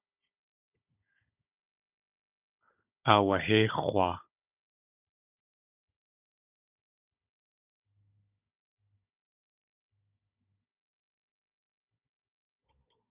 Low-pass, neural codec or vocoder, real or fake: 3.6 kHz; codec, 16 kHz, 16 kbps, FunCodec, trained on Chinese and English, 50 frames a second; fake